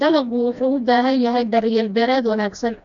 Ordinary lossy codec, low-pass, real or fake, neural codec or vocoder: none; 7.2 kHz; fake; codec, 16 kHz, 1 kbps, FreqCodec, smaller model